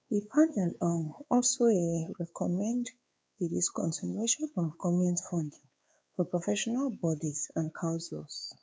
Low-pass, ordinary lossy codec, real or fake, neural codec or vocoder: none; none; fake; codec, 16 kHz, 4 kbps, X-Codec, WavLM features, trained on Multilingual LibriSpeech